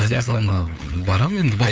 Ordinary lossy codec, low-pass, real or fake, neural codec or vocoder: none; none; fake; codec, 16 kHz, 8 kbps, FunCodec, trained on LibriTTS, 25 frames a second